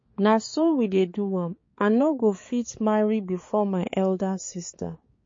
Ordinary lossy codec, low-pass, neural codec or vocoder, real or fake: MP3, 32 kbps; 7.2 kHz; codec, 16 kHz, 4 kbps, X-Codec, HuBERT features, trained on balanced general audio; fake